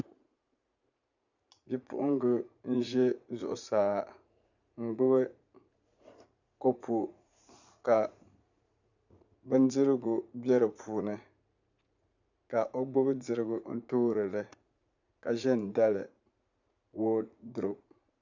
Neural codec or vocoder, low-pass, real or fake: vocoder, 44.1 kHz, 80 mel bands, Vocos; 7.2 kHz; fake